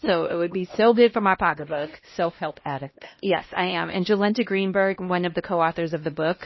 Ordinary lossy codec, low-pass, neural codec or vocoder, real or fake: MP3, 24 kbps; 7.2 kHz; codec, 16 kHz, 1 kbps, X-Codec, HuBERT features, trained on LibriSpeech; fake